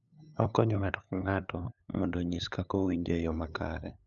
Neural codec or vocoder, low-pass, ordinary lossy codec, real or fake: codec, 16 kHz, 8 kbps, FunCodec, trained on LibriTTS, 25 frames a second; 7.2 kHz; none; fake